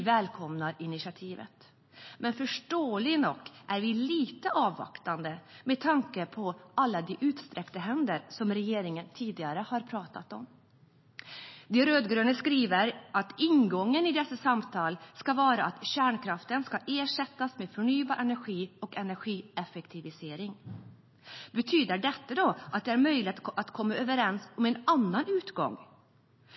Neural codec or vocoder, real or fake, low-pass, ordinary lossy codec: none; real; 7.2 kHz; MP3, 24 kbps